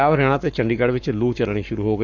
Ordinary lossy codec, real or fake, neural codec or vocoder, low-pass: none; fake; codec, 44.1 kHz, 7.8 kbps, Pupu-Codec; 7.2 kHz